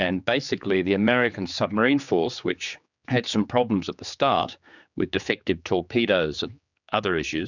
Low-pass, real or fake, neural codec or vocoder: 7.2 kHz; fake; codec, 16 kHz, 4 kbps, X-Codec, HuBERT features, trained on general audio